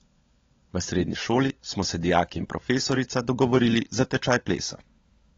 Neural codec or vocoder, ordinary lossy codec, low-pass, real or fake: codec, 16 kHz, 16 kbps, FunCodec, trained on LibriTTS, 50 frames a second; AAC, 24 kbps; 7.2 kHz; fake